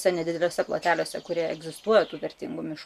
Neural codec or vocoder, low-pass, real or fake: none; 14.4 kHz; real